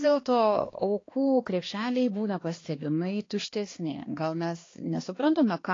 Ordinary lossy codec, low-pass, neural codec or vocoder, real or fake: AAC, 32 kbps; 7.2 kHz; codec, 16 kHz, 2 kbps, X-Codec, HuBERT features, trained on balanced general audio; fake